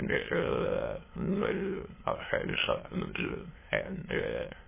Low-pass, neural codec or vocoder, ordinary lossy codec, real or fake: 3.6 kHz; autoencoder, 22.05 kHz, a latent of 192 numbers a frame, VITS, trained on many speakers; MP3, 16 kbps; fake